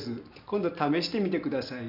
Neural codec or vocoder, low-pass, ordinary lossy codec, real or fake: none; 5.4 kHz; none; real